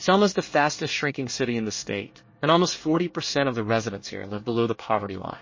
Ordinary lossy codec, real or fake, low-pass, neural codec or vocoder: MP3, 32 kbps; fake; 7.2 kHz; codec, 24 kHz, 1 kbps, SNAC